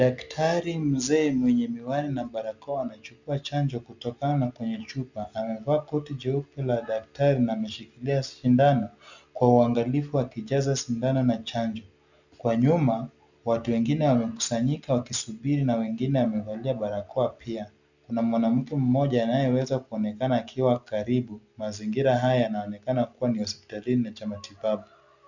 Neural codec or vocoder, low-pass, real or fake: none; 7.2 kHz; real